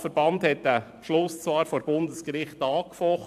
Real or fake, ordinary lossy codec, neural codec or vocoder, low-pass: fake; AAC, 96 kbps; vocoder, 44.1 kHz, 128 mel bands every 512 samples, BigVGAN v2; 14.4 kHz